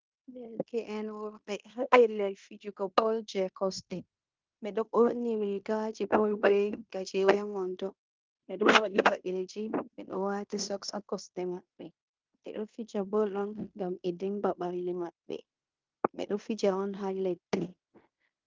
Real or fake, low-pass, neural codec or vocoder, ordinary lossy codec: fake; 7.2 kHz; codec, 16 kHz in and 24 kHz out, 0.9 kbps, LongCat-Audio-Codec, fine tuned four codebook decoder; Opus, 24 kbps